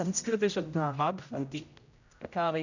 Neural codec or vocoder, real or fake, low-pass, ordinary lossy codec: codec, 16 kHz, 0.5 kbps, X-Codec, HuBERT features, trained on general audio; fake; 7.2 kHz; none